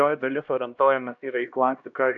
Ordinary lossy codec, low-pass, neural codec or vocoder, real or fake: AAC, 64 kbps; 7.2 kHz; codec, 16 kHz, 1 kbps, X-Codec, HuBERT features, trained on LibriSpeech; fake